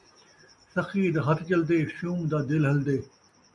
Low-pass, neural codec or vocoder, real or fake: 10.8 kHz; none; real